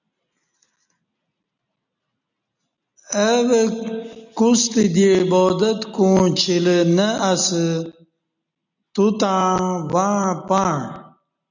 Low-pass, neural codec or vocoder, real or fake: 7.2 kHz; none; real